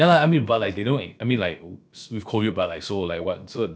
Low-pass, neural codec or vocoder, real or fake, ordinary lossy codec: none; codec, 16 kHz, about 1 kbps, DyCAST, with the encoder's durations; fake; none